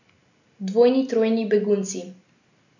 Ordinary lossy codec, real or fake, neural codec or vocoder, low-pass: none; real; none; 7.2 kHz